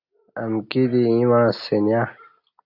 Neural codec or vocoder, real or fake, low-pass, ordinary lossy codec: none; real; 5.4 kHz; AAC, 48 kbps